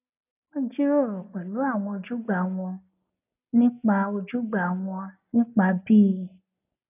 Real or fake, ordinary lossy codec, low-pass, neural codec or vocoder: real; none; 3.6 kHz; none